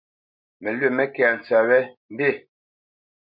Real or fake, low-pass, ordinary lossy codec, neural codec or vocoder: real; 5.4 kHz; MP3, 48 kbps; none